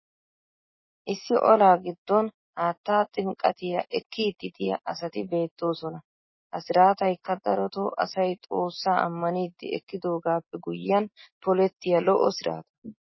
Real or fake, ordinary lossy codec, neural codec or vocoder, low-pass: real; MP3, 24 kbps; none; 7.2 kHz